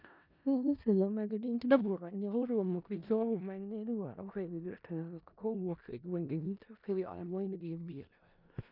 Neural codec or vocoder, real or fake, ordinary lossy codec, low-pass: codec, 16 kHz in and 24 kHz out, 0.4 kbps, LongCat-Audio-Codec, four codebook decoder; fake; none; 5.4 kHz